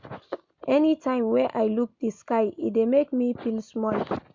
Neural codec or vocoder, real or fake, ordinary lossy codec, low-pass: none; real; MP3, 64 kbps; 7.2 kHz